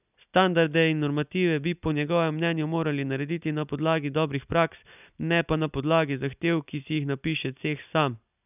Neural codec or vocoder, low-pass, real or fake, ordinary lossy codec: none; 3.6 kHz; real; none